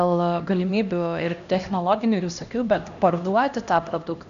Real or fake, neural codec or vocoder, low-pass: fake; codec, 16 kHz, 1 kbps, X-Codec, HuBERT features, trained on LibriSpeech; 7.2 kHz